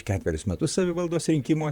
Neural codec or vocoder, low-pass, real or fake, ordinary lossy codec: vocoder, 44.1 kHz, 128 mel bands, Pupu-Vocoder; 19.8 kHz; fake; Opus, 64 kbps